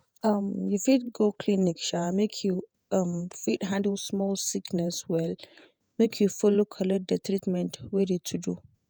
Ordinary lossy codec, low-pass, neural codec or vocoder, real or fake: none; none; vocoder, 48 kHz, 128 mel bands, Vocos; fake